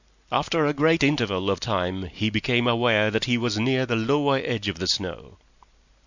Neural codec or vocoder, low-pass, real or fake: none; 7.2 kHz; real